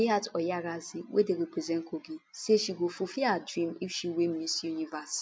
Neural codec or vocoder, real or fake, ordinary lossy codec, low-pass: none; real; none; none